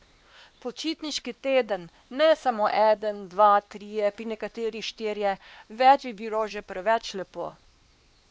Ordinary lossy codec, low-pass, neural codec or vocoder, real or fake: none; none; codec, 16 kHz, 2 kbps, X-Codec, WavLM features, trained on Multilingual LibriSpeech; fake